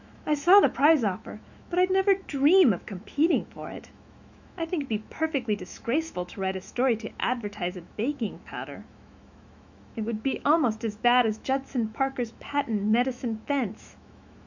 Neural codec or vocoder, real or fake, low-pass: autoencoder, 48 kHz, 128 numbers a frame, DAC-VAE, trained on Japanese speech; fake; 7.2 kHz